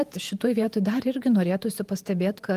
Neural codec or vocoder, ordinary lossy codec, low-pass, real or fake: vocoder, 48 kHz, 128 mel bands, Vocos; Opus, 32 kbps; 14.4 kHz; fake